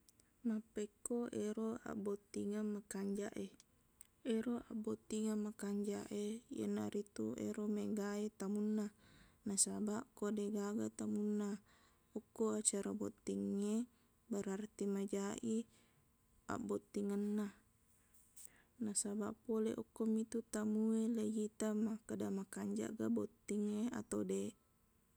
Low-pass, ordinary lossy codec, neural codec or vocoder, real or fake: none; none; none; real